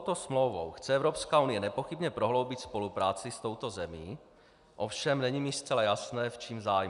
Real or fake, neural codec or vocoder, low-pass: real; none; 10.8 kHz